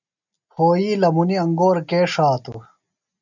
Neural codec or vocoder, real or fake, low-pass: none; real; 7.2 kHz